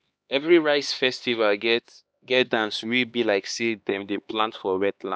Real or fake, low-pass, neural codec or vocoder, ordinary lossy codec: fake; none; codec, 16 kHz, 2 kbps, X-Codec, HuBERT features, trained on LibriSpeech; none